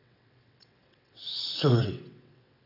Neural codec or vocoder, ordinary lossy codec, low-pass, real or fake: vocoder, 22.05 kHz, 80 mel bands, WaveNeXt; none; 5.4 kHz; fake